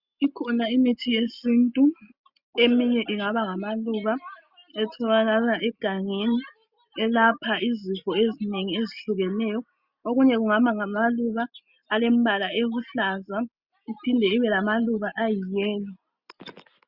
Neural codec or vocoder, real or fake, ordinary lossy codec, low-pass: none; real; Opus, 64 kbps; 5.4 kHz